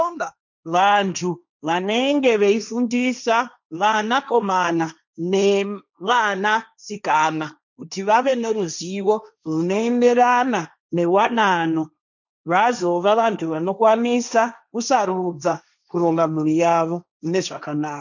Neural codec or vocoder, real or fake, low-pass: codec, 16 kHz, 1.1 kbps, Voila-Tokenizer; fake; 7.2 kHz